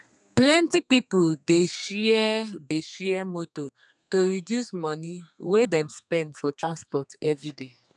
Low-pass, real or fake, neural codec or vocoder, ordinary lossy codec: 10.8 kHz; fake; codec, 32 kHz, 1.9 kbps, SNAC; none